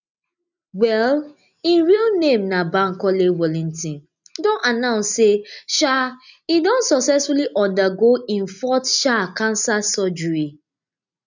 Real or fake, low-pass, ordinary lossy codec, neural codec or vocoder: real; 7.2 kHz; none; none